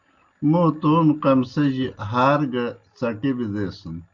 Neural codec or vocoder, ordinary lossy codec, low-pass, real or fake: none; Opus, 32 kbps; 7.2 kHz; real